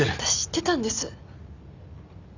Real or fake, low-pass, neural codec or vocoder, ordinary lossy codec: real; 7.2 kHz; none; none